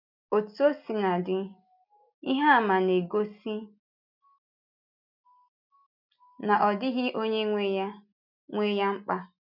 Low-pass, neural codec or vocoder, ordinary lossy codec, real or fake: 5.4 kHz; none; none; real